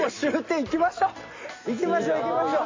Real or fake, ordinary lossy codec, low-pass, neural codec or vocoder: real; MP3, 32 kbps; 7.2 kHz; none